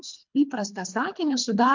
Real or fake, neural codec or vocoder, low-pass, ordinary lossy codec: fake; codec, 24 kHz, 3 kbps, HILCodec; 7.2 kHz; MP3, 64 kbps